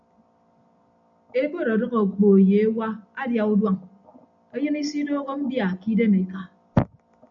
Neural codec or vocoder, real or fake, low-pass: none; real; 7.2 kHz